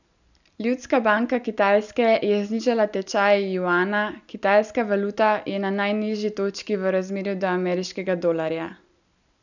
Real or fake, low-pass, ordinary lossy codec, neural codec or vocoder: real; 7.2 kHz; none; none